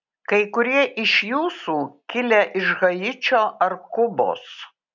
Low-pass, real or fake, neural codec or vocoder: 7.2 kHz; real; none